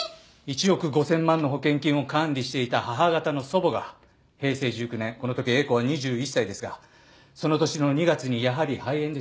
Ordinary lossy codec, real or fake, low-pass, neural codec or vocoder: none; real; none; none